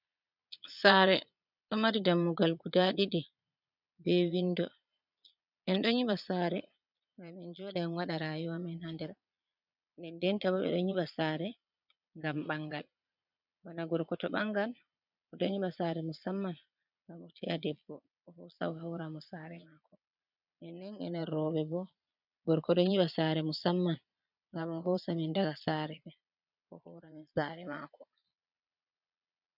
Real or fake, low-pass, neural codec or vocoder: fake; 5.4 kHz; vocoder, 22.05 kHz, 80 mel bands, Vocos